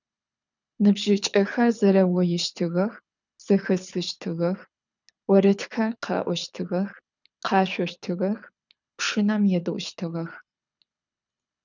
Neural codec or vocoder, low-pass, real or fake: codec, 24 kHz, 6 kbps, HILCodec; 7.2 kHz; fake